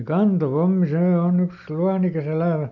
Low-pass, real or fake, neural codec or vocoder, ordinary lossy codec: 7.2 kHz; real; none; none